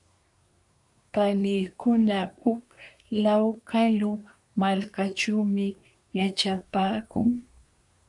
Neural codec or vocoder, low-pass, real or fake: codec, 24 kHz, 1 kbps, SNAC; 10.8 kHz; fake